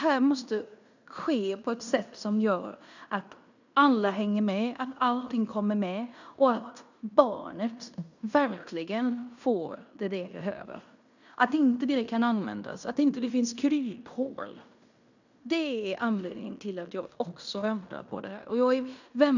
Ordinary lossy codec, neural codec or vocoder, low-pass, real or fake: none; codec, 16 kHz in and 24 kHz out, 0.9 kbps, LongCat-Audio-Codec, fine tuned four codebook decoder; 7.2 kHz; fake